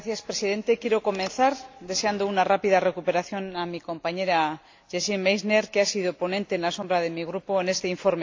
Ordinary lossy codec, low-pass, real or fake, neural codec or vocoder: AAC, 48 kbps; 7.2 kHz; real; none